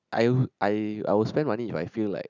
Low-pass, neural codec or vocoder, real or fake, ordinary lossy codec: 7.2 kHz; none; real; none